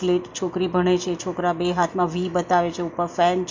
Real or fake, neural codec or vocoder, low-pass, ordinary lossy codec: real; none; 7.2 kHz; MP3, 48 kbps